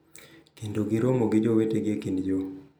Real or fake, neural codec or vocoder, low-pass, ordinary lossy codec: real; none; none; none